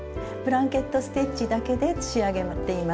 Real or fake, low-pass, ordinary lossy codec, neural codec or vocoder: real; none; none; none